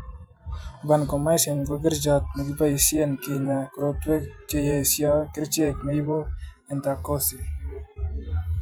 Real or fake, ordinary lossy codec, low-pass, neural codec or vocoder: fake; none; none; vocoder, 44.1 kHz, 128 mel bands every 512 samples, BigVGAN v2